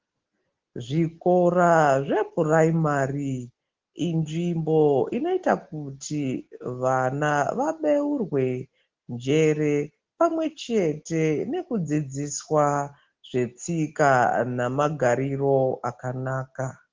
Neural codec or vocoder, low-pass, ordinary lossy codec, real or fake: none; 7.2 kHz; Opus, 16 kbps; real